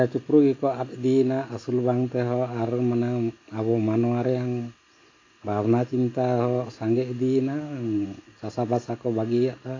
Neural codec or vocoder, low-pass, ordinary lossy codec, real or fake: none; 7.2 kHz; AAC, 32 kbps; real